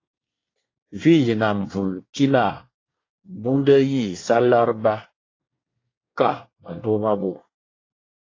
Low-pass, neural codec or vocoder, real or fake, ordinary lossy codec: 7.2 kHz; codec, 24 kHz, 1 kbps, SNAC; fake; AAC, 32 kbps